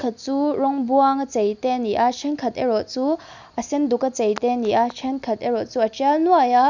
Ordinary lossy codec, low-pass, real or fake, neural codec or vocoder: none; 7.2 kHz; real; none